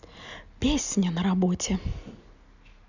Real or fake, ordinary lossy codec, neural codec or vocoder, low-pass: real; none; none; 7.2 kHz